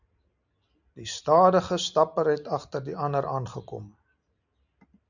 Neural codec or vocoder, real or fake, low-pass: none; real; 7.2 kHz